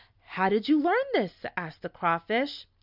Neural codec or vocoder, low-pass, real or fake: none; 5.4 kHz; real